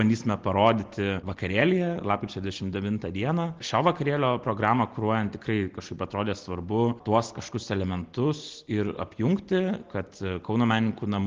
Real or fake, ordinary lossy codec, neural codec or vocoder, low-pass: real; Opus, 16 kbps; none; 7.2 kHz